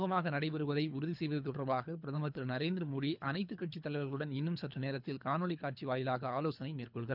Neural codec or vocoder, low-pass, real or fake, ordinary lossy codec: codec, 24 kHz, 3 kbps, HILCodec; 5.4 kHz; fake; none